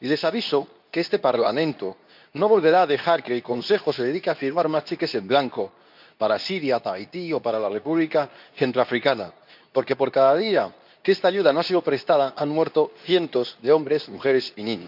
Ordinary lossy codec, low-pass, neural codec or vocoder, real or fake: none; 5.4 kHz; codec, 24 kHz, 0.9 kbps, WavTokenizer, medium speech release version 2; fake